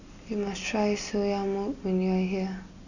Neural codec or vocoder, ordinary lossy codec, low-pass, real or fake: none; none; 7.2 kHz; real